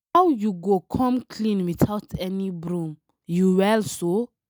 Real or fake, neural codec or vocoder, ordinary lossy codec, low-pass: real; none; none; none